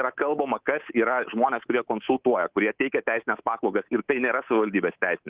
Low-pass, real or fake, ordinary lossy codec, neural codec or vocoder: 3.6 kHz; fake; Opus, 16 kbps; codec, 24 kHz, 3.1 kbps, DualCodec